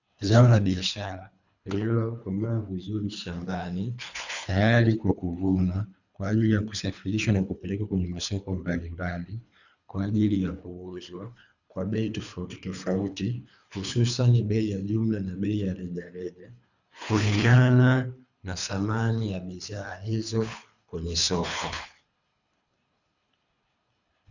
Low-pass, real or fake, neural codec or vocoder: 7.2 kHz; fake; codec, 24 kHz, 3 kbps, HILCodec